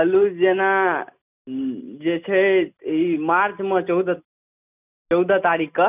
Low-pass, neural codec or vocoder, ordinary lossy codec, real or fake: 3.6 kHz; none; none; real